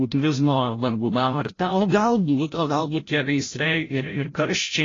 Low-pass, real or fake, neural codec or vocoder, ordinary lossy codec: 7.2 kHz; fake; codec, 16 kHz, 0.5 kbps, FreqCodec, larger model; AAC, 32 kbps